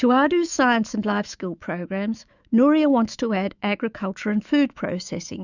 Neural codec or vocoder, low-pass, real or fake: autoencoder, 48 kHz, 128 numbers a frame, DAC-VAE, trained on Japanese speech; 7.2 kHz; fake